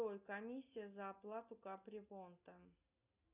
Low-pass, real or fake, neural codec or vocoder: 3.6 kHz; real; none